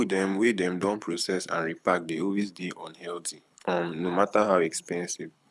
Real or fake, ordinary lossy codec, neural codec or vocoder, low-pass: fake; none; codec, 24 kHz, 6 kbps, HILCodec; none